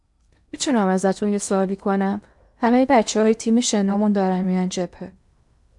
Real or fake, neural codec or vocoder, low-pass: fake; codec, 16 kHz in and 24 kHz out, 0.8 kbps, FocalCodec, streaming, 65536 codes; 10.8 kHz